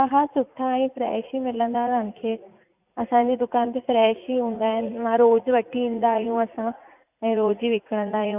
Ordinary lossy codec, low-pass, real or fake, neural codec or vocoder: none; 3.6 kHz; fake; vocoder, 44.1 kHz, 80 mel bands, Vocos